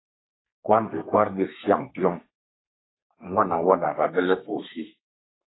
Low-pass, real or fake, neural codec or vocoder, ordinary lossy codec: 7.2 kHz; fake; codec, 32 kHz, 1.9 kbps, SNAC; AAC, 16 kbps